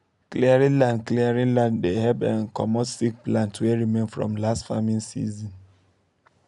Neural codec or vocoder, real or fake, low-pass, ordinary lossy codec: none; real; 10.8 kHz; none